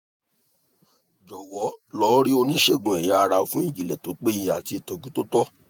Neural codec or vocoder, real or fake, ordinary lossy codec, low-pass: vocoder, 48 kHz, 128 mel bands, Vocos; fake; none; none